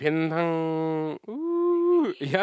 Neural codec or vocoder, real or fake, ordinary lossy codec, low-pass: none; real; none; none